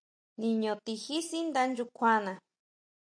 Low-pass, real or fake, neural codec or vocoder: 9.9 kHz; real; none